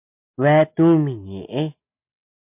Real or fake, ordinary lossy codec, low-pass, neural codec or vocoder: real; MP3, 32 kbps; 3.6 kHz; none